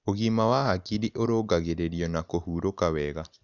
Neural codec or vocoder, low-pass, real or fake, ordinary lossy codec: none; 7.2 kHz; real; none